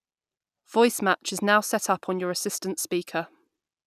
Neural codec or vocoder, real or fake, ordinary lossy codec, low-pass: none; real; none; 14.4 kHz